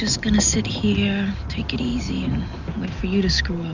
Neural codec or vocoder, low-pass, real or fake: none; 7.2 kHz; real